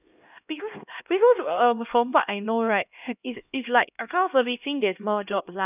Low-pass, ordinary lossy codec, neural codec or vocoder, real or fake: 3.6 kHz; none; codec, 16 kHz, 1 kbps, X-Codec, HuBERT features, trained on LibriSpeech; fake